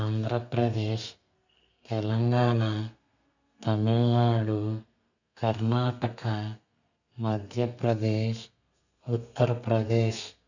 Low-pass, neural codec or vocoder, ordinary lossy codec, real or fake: 7.2 kHz; codec, 32 kHz, 1.9 kbps, SNAC; none; fake